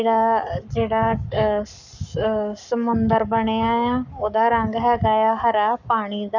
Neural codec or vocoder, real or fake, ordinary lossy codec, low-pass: codec, 44.1 kHz, 7.8 kbps, Pupu-Codec; fake; none; 7.2 kHz